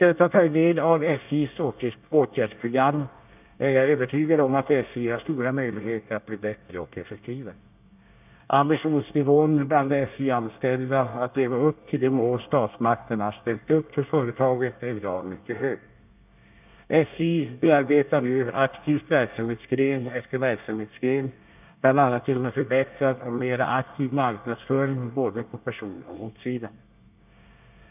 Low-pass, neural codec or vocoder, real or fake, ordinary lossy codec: 3.6 kHz; codec, 24 kHz, 1 kbps, SNAC; fake; none